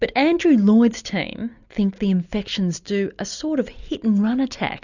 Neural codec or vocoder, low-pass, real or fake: none; 7.2 kHz; real